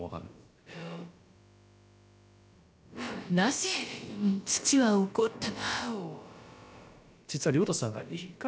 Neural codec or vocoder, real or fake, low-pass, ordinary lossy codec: codec, 16 kHz, about 1 kbps, DyCAST, with the encoder's durations; fake; none; none